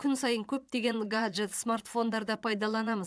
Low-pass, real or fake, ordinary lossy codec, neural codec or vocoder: none; fake; none; vocoder, 22.05 kHz, 80 mel bands, Vocos